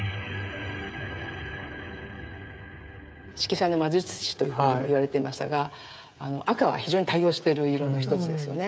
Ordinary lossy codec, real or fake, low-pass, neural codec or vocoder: none; fake; none; codec, 16 kHz, 8 kbps, FreqCodec, smaller model